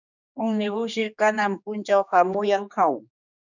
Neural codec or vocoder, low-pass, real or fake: codec, 16 kHz, 2 kbps, X-Codec, HuBERT features, trained on general audio; 7.2 kHz; fake